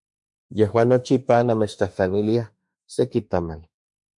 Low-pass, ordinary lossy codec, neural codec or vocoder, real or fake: 10.8 kHz; MP3, 64 kbps; autoencoder, 48 kHz, 32 numbers a frame, DAC-VAE, trained on Japanese speech; fake